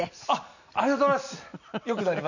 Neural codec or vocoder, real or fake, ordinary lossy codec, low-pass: none; real; none; 7.2 kHz